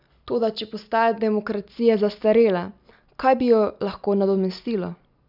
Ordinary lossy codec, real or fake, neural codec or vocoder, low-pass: none; real; none; 5.4 kHz